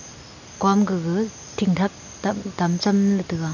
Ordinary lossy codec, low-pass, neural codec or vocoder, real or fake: none; 7.2 kHz; none; real